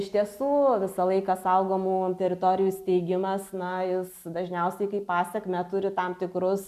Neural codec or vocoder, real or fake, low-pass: none; real; 14.4 kHz